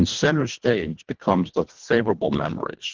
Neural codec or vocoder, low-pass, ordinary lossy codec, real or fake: codec, 24 kHz, 1.5 kbps, HILCodec; 7.2 kHz; Opus, 16 kbps; fake